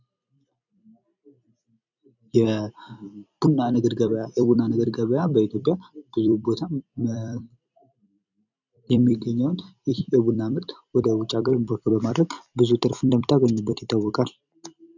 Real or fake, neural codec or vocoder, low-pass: fake; vocoder, 44.1 kHz, 128 mel bands every 256 samples, BigVGAN v2; 7.2 kHz